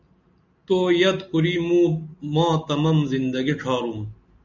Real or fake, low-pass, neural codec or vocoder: real; 7.2 kHz; none